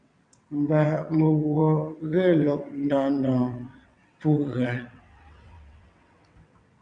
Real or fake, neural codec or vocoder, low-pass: fake; vocoder, 22.05 kHz, 80 mel bands, WaveNeXt; 9.9 kHz